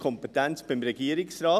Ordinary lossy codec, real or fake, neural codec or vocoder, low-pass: none; real; none; 14.4 kHz